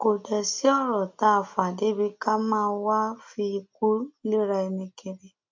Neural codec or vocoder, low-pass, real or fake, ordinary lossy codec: none; 7.2 kHz; real; MP3, 64 kbps